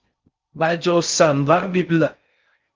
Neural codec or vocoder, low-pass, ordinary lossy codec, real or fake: codec, 16 kHz in and 24 kHz out, 0.6 kbps, FocalCodec, streaming, 4096 codes; 7.2 kHz; Opus, 24 kbps; fake